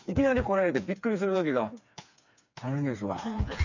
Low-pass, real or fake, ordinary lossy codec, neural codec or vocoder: 7.2 kHz; fake; none; codec, 16 kHz, 4 kbps, FreqCodec, smaller model